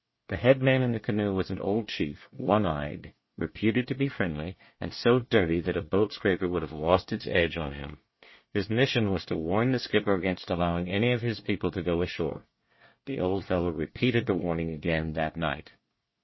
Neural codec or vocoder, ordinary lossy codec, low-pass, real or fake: codec, 24 kHz, 1 kbps, SNAC; MP3, 24 kbps; 7.2 kHz; fake